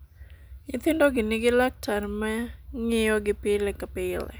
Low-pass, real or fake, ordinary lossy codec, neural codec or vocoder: none; real; none; none